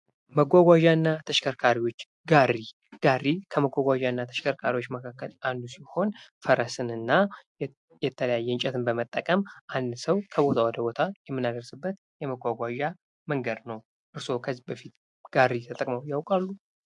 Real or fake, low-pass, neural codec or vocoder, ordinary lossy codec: real; 10.8 kHz; none; MP3, 64 kbps